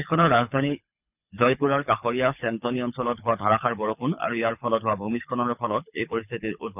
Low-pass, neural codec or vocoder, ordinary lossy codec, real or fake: 3.6 kHz; codec, 24 kHz, 6 kbps, HILCodec; none; fake